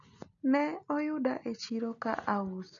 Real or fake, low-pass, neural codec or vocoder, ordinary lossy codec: real; 7.2 kHz; none; none